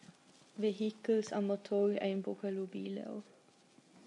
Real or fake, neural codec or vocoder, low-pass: real; none; 10.8 kHz